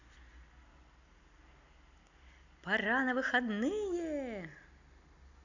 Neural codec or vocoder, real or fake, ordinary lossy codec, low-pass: none; real; none; 7.2 kHz